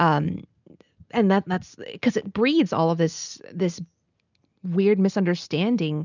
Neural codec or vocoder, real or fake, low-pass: none; real; 7.2 kHz